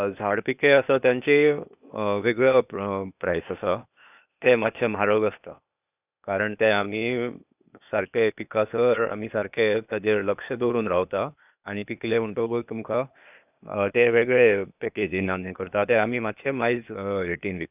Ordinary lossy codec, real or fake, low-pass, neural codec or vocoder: none; fake; 3.6 kHz; codec, 16 kHz, 0.8 kbps, ZipCodec